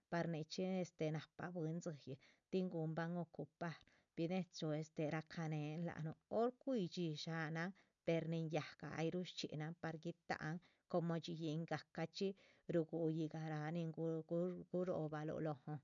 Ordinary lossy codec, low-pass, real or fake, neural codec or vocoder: none; 7.2 kHz; real; none